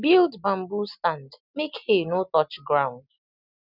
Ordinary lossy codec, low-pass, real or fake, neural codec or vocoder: none; 5.4 kHz; real; none